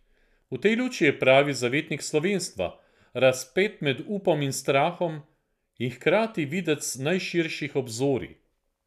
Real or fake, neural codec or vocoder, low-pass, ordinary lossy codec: real; none; 14.4 kHz; none